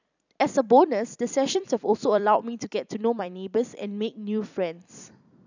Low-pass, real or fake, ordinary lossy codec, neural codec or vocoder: 7.2 kHz; fake; none; vocoder, 44.1 kHz, 128 mel bands every 256 samples, BigVGAN v2